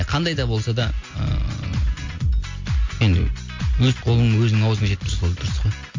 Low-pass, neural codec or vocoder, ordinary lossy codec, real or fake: 7.2 kHz; none; MP3, 48 kbps; real